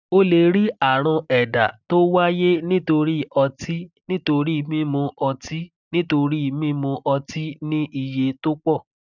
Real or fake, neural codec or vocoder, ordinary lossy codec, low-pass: real; none; none; 7.2 kHz